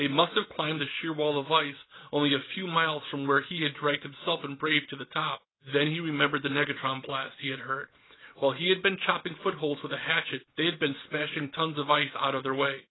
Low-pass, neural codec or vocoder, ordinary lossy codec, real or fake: 7.2 kHz; none; AAC, 16 kbps; real